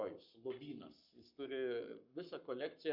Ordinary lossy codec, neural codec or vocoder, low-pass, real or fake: Opus, 64 kbps; codec, 44.1 kHz, 7.8 kbps, Pupu-Codec; 5.4 kHz; fake